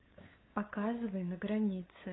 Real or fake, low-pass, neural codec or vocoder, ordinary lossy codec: fake; 7.2 kHz; codec, 16 kHz, 8 kbps, FunCodec, trained on LibriTTS, 25 frames a second; AAC, 16 kbps